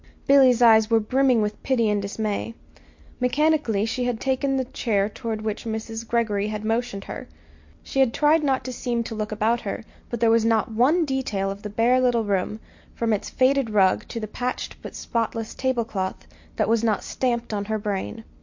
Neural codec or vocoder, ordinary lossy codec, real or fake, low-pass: none; MP3, 48 kbps; real; 7.2 kHz